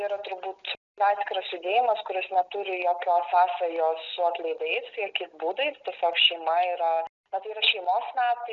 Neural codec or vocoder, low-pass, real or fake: none; 7.2 kHz; real